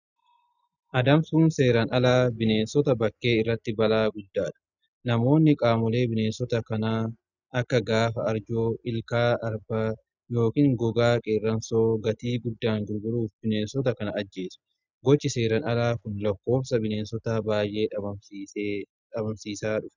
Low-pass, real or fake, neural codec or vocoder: 7.2 kHz; real; none